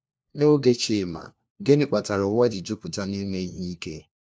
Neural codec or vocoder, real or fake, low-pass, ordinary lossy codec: codec, 16 kHz, 1 kbps, FunCodec, trained on LibriTTS, 50 frames a second; fake; none; none